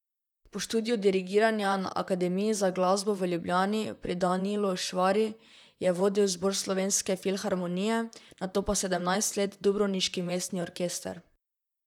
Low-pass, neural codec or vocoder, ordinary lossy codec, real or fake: 19.8 kHz; vocoder, 44.1 kHz, 128 mel bands, Pupu-Vocoder; none; fake